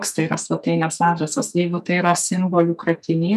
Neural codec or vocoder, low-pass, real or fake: codec, 44.1 kHz, 2.6 kbps, SNAC; 14.4 kHz; fake